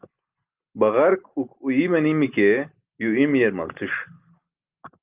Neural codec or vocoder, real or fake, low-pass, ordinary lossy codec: none; real; 3.6 kHz; Opus, 24 kbps